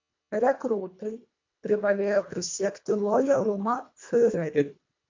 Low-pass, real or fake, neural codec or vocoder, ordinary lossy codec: 7.2 kHz; fake; codec, 24 kHz, 1.5 kbps, HILCodec; MP3, 48 kbps